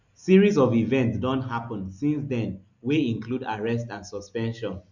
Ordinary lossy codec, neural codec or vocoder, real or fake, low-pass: none; none; real; 7.2 kHz